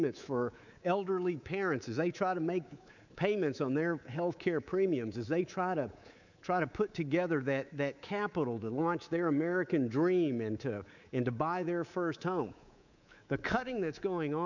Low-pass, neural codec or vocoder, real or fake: 7.2 kHz; codec, 24 kHz, 3.1 kbps, DualCodec; fake